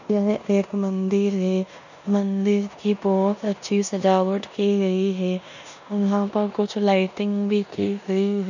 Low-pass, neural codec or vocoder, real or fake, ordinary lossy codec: 7.2 kHz; codec, 16 kHz in and 24 kHz out, 0.9 kbps, LongCat-Audio-Codec, four codebook decoder; fake; none